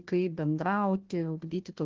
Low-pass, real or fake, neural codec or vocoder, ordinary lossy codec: 7.2 kHz; fake; codec, 16 kHz, 1 kbps, FunCodec, trained on Chinese and English, 50 frames a second; Opus, 16 kbps